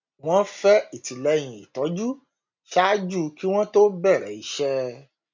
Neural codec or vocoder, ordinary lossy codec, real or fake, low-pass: none; MP3, 64 kbps; real; 7.2 kHz